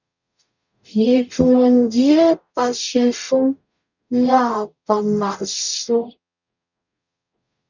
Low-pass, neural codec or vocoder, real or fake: 7.2 kHz; codec, 44.1 kHz, 0.9 kbps, DAC; fake